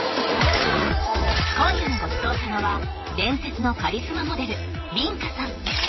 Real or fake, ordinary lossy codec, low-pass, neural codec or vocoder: fake; MP3, 24 kbps; 7.2 kHz; vocoder, 44.1 kHz, 80 mel bands, Vocos